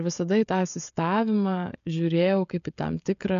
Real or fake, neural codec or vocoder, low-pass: fake; codec, 16 kHz, 16 kbps, FreqCodec, smaller model; 7.2 kHz